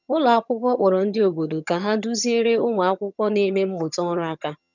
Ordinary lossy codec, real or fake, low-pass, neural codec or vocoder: none; fake; 7.2 kHz; vocoder, 22.05 kHz, 80 mel bands, HiFi-GAN